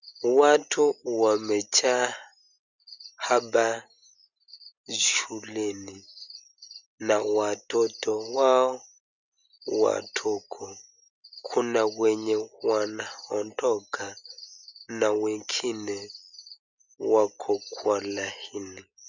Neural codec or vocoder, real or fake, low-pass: none; real; 7.2 kHz